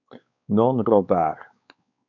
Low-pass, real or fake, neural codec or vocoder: 7.2 kHz; fake; codec, 16 kHz, 4 kbps, X-Codec, WavLM features, trained on Multilingual LibriSpeech